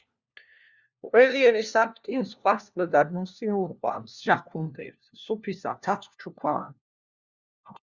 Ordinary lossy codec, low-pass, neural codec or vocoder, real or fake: Opus, 64 kbps; 7.2 kHz; codec, 16 kHz, 1 kbps, FunCodec, trained on LibriTTS, 50 frames a second; fake